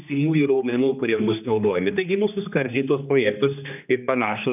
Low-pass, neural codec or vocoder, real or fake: 3.6 kHz; codec, 16 kHz, 2 kbps, X-Codec, HuBERT features, trained on general audio; fake